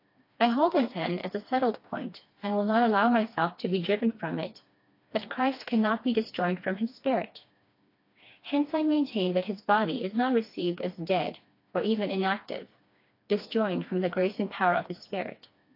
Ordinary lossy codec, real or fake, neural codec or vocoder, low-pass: AAC, 32 kbps; fake; codec, 16 kHz, 2 kbps, FreqCodec, smaller model; 5.4 kHz